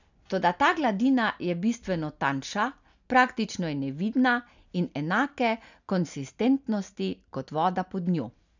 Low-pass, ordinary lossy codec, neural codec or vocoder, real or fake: 7.2 kHz; none; none; real